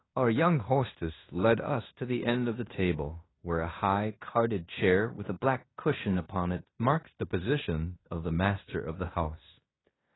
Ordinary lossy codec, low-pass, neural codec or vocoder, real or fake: AAC, 16 kbps; 7.2 kHz; codec, 16 kHz in and 24 kHz out, 0.9 kbps, LongCat-Audio-Codec, fine tuned four codebook decoder; fake